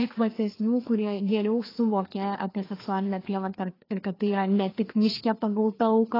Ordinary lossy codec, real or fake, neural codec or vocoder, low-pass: AAC, 24 kbps; fake; codec, 16 kHz, 2 kbps, FreqCodec, larger model; 5.4 kHz